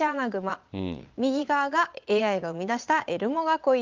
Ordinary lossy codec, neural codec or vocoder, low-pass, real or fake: Opus, 24 kbps; vocoder, 22.05 kHz, 80 mel bands, Vocos; 7.2 kHz; fake